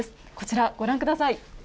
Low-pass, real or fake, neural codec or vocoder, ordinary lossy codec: none; real; none; none